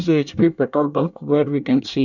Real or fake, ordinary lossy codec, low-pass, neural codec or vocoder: fake; none; 7.2 kHz; codec, 24 kHz, 1 kbps, SNAC